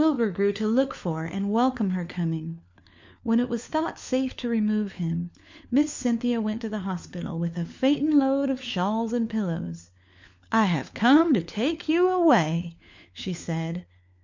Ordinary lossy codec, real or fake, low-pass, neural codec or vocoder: MP3, 64 kbps; fake; 7.2 kHz; codec, 16 kHz, 4 kbps, FunCodec, trained on LibriTTS, 50 frames a second